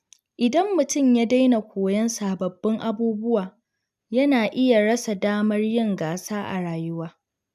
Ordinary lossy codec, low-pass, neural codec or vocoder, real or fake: AAC, 96 kbps; 14.4 kHz; none; real